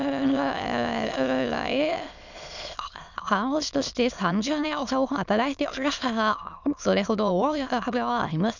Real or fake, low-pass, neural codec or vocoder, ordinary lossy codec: fake; 7.2 kHz; autoencoder, 22.05 kHz, a latent of 192 numbers a frame, VITS, trained on many speakers; none